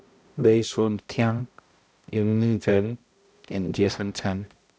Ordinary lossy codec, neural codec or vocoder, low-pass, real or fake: none; codec, 16 kHz, 0.5 kbps, X-Codec, HuBERT features, trained on balanced general audio; none; fake